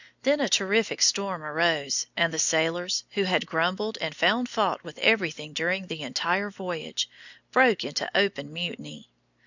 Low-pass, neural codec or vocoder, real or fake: 7.2 kHz; none; real